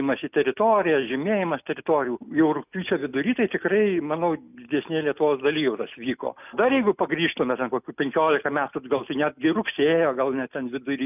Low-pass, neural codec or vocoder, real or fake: 3.6 kHz; none; real